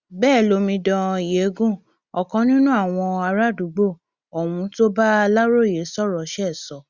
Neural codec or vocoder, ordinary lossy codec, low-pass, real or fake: none; Opus, 64 kbps; 7.2 kHz; real